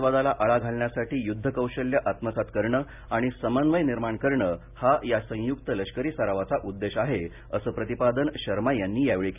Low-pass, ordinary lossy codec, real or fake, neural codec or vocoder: 3.6 kHz; none; real; none